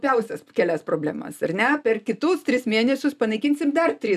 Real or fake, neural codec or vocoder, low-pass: real; none; 14.4 kHz